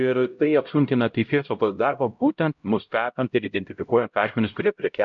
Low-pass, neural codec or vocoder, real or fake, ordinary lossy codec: 7.2 kHz; codec, 16 kHz, 0.5 kbps, X-Codec, HuBERT features, trained on LibriSpeech; fake; AAC, 64 kbps